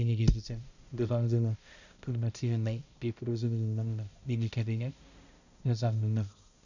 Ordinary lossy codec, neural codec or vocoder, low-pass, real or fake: none; codec, 16 kHz, 0.5 kbps, X-Codec, HuBERT features, trained on balanced general audio; 7.2 kHz; fake